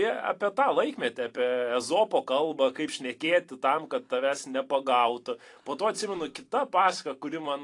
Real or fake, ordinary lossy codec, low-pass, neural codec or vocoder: fake; AAC, 48 kbps; 10.8 kHz; vocoder, 44.1 kHz, 128 mel bands every 256 samples, BigVGAN v2